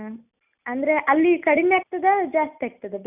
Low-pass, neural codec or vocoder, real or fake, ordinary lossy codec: 3.6 kHz; none; real; none